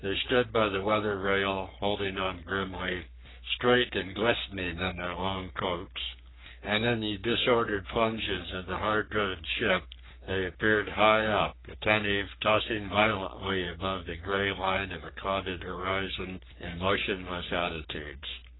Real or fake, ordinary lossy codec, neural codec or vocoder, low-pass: fake; AAC, 16 kbps; codec, 44.1 kHz, 3.4 kbps, Pupu-Codec; 7.2 kHz